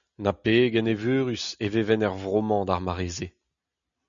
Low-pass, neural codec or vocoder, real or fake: 7.2 kHz; none; real